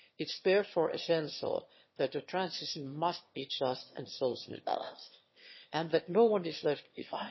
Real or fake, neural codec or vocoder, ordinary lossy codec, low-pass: fake; autoencoder, 22.05 kHz, a latent of 192 numbers a frame, VITS, trained on one speaker; MP3, 24 kbps; 7.2 kHz